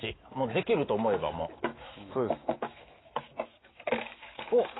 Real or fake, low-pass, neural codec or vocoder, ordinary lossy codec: fake; 7.2 kHz; autoencoder, 48 kHz, 128 numbers a frame, DAC-VAE, trained on Japanese speech; AAC, 16 kbps